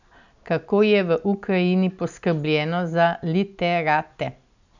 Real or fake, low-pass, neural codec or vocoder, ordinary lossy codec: real; 7.2 kHz; none; none